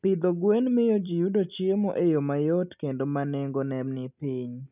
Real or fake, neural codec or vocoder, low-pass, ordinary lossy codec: real; none; 3.6 kHz; none